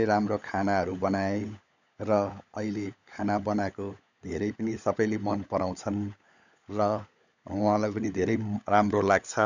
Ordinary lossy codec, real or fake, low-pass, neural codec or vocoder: none; fake; 7.2 kHz; codec, 16 kHz, 16 kbps, FunCodec, trained on LibriTTS, 50 frames a second